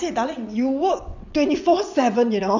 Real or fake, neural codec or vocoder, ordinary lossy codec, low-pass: fake; vocoder, 22.05 kHz, 80 mel bands, WaveNeXt; none; 7.2 kHz